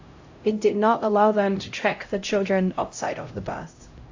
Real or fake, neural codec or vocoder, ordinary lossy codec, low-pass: fake; codec, 16 kHz, 0.5 kbps, X-Codec, HuBERT features, trained on LibriSpeech; AAC, 48 kbps; 7.2 kHz